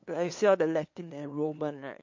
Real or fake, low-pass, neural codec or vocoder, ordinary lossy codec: fake; 7.2 kHz; codec, 16 kHz, 2 kbps, FunCodec, trained on LibriTTS, 25 frames a second; MP3, 48 kbps